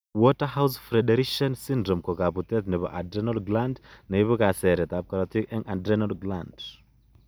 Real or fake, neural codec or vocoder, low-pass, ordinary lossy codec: real; none; none; none